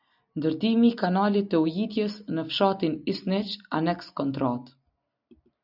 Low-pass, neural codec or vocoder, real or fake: 5.4 kHz; none; real